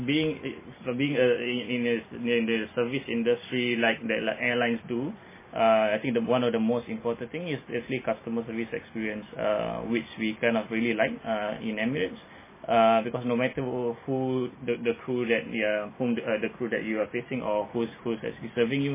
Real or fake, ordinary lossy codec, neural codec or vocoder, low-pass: real; MP3, 16 kbps; none; 3.6 kHz